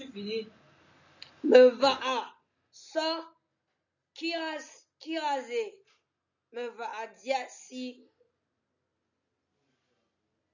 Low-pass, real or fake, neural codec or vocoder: 7.2 kHz; real; none